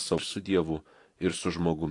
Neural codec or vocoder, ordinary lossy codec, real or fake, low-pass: vocoder, 44.1 kHz, 128 mel bands every 256 samples, BigVGAN v2; AAC, 48 kbps; fake; 10.8 kHz